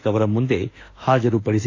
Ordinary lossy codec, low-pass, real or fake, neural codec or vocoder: AAC, 32 kbps; 7.2 kHz; fake; autoencoder, 48 kHz, 32 numbers a frame, DAC-VAE, trained on Japanese speech